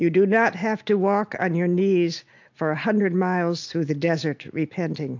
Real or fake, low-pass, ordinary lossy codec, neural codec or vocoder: real; 7.2 kHz; AAC, 48 kbps; none